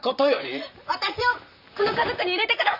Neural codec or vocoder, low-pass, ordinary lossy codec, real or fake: vocoder, 44.1 kHz, 128 mel bands, Pupu-Vocoder; 5.4 kHz; none; fake